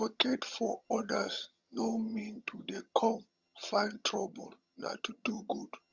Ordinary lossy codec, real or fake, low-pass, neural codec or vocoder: Opus, 64 kbps; fake; 7.2 kHz; vocoder, 22.05 kHz, 80 mel bands, HiFi-GAN